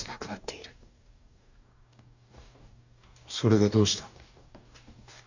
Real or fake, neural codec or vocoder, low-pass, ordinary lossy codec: fake; codec, 44.1 kHz, 2.6 kbps, DAC; 7.2 kHz; none